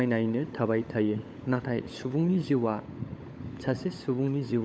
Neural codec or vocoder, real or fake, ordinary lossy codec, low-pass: codec, 16 kHz, 16 kbps, FunCodec, trained on LibriTTS, 50 frames a second; fake; none; none